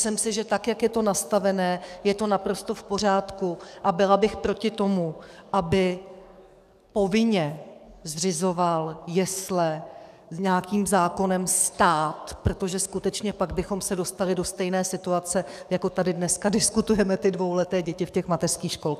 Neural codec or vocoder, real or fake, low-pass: codec, 44.1 kHz, 7.8 kbps, DAC; fake; 14.4 kHz